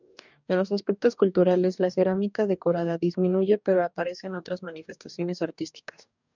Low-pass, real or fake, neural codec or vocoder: 7.2 kHz; fake; codec, 44.1 kHz, 2.6 kbps, DAC